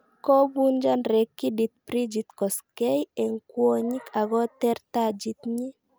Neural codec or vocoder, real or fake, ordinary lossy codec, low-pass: none; real; none; none